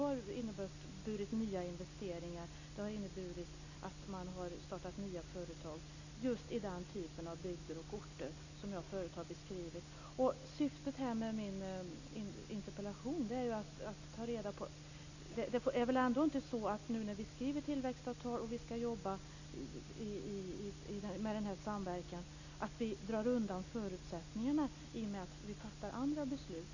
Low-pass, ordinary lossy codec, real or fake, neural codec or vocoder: 7.2 kHz; none; real; none